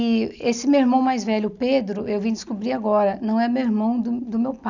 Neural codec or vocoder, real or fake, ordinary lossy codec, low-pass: none; real; none; 7.2 kHz